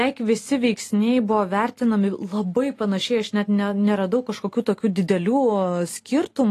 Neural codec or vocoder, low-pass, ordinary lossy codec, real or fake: none; 14.4 kHz; AAC, 48 kbps; real